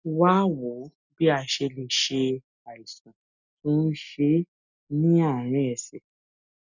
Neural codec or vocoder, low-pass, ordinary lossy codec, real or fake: none; 7.2 kHz; none; real